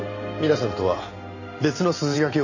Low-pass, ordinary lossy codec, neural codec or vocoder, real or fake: 7.2 kHz; none; none; real